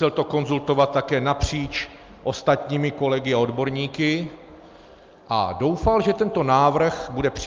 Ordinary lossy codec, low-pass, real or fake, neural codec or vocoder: Opus, 24 kbps; 7.2 kHz; real; none